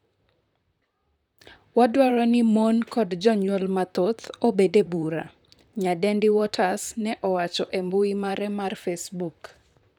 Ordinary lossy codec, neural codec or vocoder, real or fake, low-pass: none; vocoder, 44.1 kHz, 128 mel bands, Pupu-Vocoder; fake; 19.8 kHz